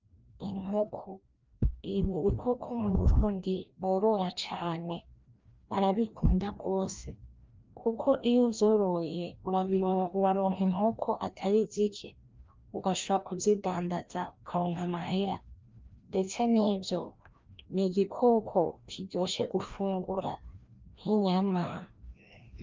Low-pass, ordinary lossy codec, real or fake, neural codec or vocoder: 7.2 kHz; Opus, 24 kbps; fake; codec, 16 kHz, 1 kbps, FreqCodec, larger model